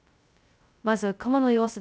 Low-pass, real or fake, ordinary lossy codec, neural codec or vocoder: none; fake; none; codec, 16 kHz, 0.2 kbps, FocalCodec